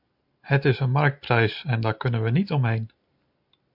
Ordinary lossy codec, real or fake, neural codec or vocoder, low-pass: MP3, 48 kbps; real; none; 5.4 kHz